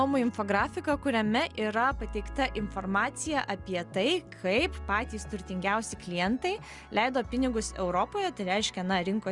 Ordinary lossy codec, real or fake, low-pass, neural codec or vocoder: Opus, 64 kbps; real; 10.8 kHz; none